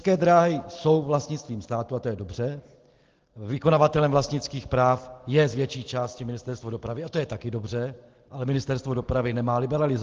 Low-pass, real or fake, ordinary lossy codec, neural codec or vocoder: 7.2 kHz; real; Opus, 16 kbps; none